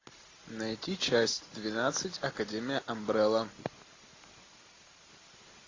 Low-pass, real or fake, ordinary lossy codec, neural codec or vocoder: 7.2 kHz; real; AAC, 32 kbps; none